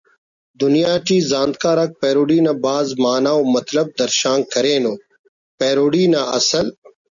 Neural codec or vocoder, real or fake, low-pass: none; real; 7.2 kHz